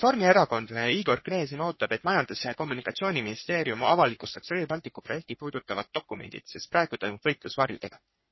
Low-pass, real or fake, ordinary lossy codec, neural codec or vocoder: 7.2 kHz; fake; MP3, 24 kbps; codec, 44.1 kHz, 3.4 kbps, Pupu-Codec